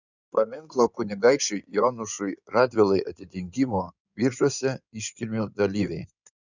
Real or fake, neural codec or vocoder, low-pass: fake; codec, 16 kHz in and 24 kHz out, 2.2 kbps, FireRedTTS-2 codec; 7.2 kHz